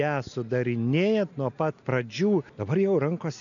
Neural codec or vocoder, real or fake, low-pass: none; real; 7.2 kHz